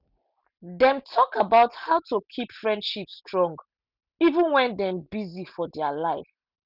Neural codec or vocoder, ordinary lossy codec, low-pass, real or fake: none; none; 5.4 kHz; real